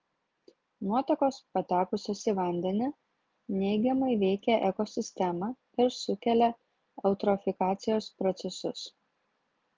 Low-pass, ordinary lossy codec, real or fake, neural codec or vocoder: 7.2 kHz; Opus, 32 kbps; real; none